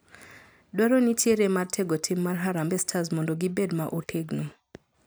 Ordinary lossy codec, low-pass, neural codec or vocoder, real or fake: none; none; none; real